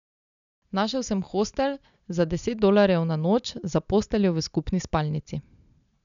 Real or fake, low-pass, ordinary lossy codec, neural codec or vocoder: real; 7.2 kHz; none; none